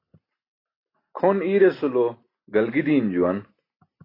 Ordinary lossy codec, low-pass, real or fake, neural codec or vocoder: AAC, 24 kbps; 5.4 kHz; real; none